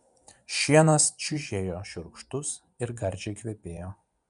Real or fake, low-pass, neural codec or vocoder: fake; 10.8 kHz; vocoder, 24 kHz, 100 mel bands, Vocos